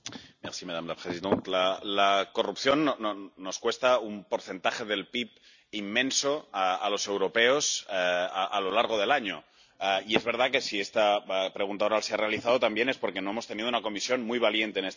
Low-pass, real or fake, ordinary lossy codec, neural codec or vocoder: 7.2 kHz; real; none; none